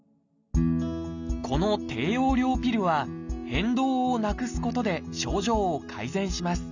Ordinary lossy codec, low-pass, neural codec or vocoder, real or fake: none; 7.2 kHz; none; real